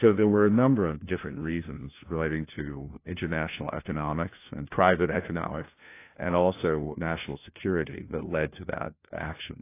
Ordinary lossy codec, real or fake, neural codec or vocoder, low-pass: AAC, 24 kbps; fake; codec, 16 kHz, 1 kbps, FunCodec, trained on LibriTTS, 50 frames a second; 3.6 kHz